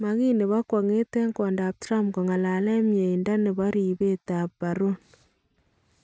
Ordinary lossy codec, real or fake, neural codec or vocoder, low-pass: none; real; none; none